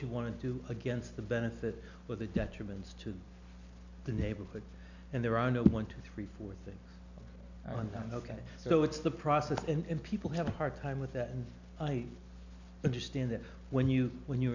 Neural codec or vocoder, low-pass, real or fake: none; 7.2 kHz; real